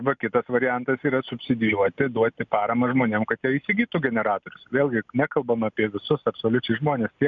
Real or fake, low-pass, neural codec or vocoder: real; 7.2 kHz; none